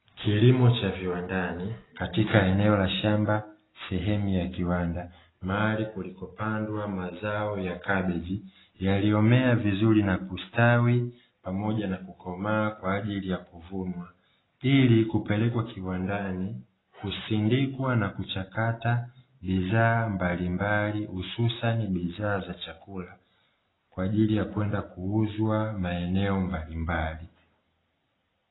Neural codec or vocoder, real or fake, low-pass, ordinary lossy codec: none; real; 7.2 kHz; AAC, 16 kbps